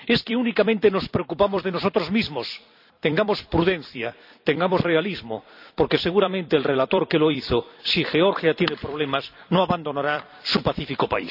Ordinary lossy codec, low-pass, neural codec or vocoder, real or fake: none; 5.4 kHz; vocoder, 44.1 kHz, 128 mel bands every 256 samples, BigVGAN v2; fake